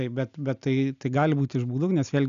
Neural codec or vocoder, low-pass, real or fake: none; 7.2 kHz; real